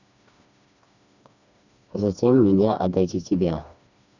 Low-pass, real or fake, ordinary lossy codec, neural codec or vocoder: 7.2 kHz; fake; none; codec, 16 kHz, 2 kbps, FreqCodec, smaller model